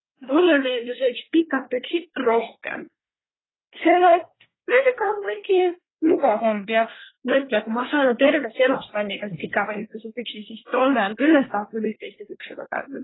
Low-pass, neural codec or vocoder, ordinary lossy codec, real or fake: 7.2 kHz; codec, 24 kHz, 1 kbps, SNAC; AAC, 16 kbps; fake